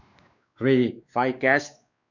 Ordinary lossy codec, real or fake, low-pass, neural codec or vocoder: none; fake; 7.2 kHz; codec, 16 kHz, 2 kbps, X-Codec, WavLM features, trained on Multilingual LibriSpeech